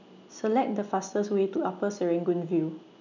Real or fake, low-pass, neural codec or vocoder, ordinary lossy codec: real; 7.2 kHz; none; none